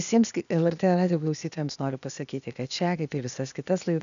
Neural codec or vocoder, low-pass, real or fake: codec, 16 kHz, 0.8 kbps, ZipCodec; 7.2 kHz; fake